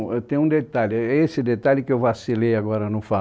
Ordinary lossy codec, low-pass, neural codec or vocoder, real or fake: none; none; none; real